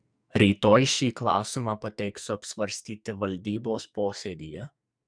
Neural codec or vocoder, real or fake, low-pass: codec, 44.1 kHz, 2.6 kbps, SNAC; fake; 9.9 kHz